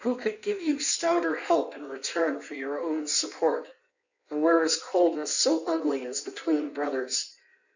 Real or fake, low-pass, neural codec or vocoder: fake; 7.2 kHz; codec, 16 kHz in and 24 kHz out, 1.1 kbps, FireRedTTS-2 codec